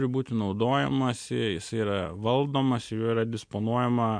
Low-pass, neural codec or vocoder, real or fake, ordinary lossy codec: 9.9 kHz; none; real; MP3, 64 kbps